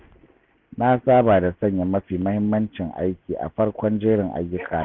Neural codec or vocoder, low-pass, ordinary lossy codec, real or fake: none; none; none; real